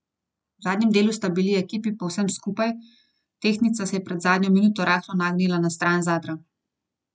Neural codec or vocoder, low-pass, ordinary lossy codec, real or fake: none; none; none; real